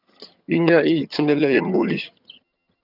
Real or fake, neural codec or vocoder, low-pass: fake; vocoder, 22.05 kHz, 80 mel bands, HiFi-GAN; 5.4 kHz